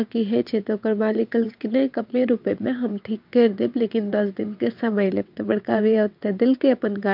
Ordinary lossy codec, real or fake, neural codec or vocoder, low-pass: MP3, 48 kbps; fake; vocoder, 22.05 kHz, 80 mel bands, WaveNeXt; 5.4 kHz